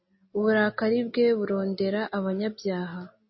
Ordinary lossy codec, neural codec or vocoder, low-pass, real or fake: MP3, 24 kbps; none; 7.2 kHz; real